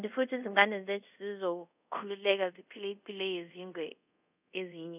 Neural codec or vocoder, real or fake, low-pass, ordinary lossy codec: codec, 24 kHz, 0.5 kbps, DualCodec; fake; 3.6 kHz; none